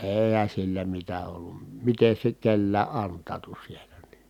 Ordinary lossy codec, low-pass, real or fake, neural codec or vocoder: none; 19.8 kHz; real; none